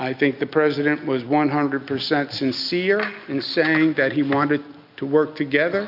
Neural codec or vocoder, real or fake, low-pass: none; real; 5.4 kHz